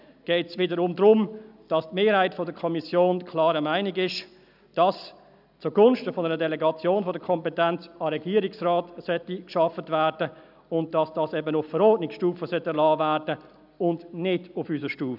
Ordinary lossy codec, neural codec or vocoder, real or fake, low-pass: none; none; real; 5.4 kHz